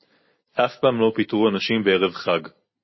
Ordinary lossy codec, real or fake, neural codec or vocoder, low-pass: MP3, 24 kbps; real; none; 7.2 kHz